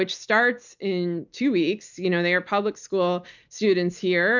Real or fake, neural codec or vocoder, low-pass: real; none; 7.2 kHz